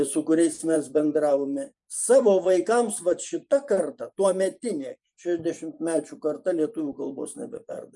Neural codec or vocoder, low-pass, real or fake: vocoder, 24 kHz, 100 mel bands, Vocos; 10.8 kHz; fake